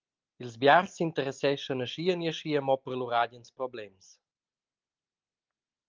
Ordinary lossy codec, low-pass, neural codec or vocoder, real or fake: Opus, 32 kbps; 7.2 kHz; none; real